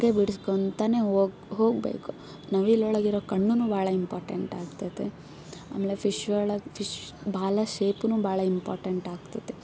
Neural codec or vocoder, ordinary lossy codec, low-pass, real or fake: none; none; none; real